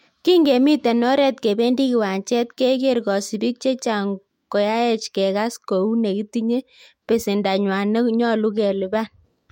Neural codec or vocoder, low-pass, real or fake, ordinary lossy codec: autoencoder, 48 kHz, 128 numbers a frame, DAC-VAE, trained on Japanese speech; 19.8 kHz; fake; MP3, 64 kbps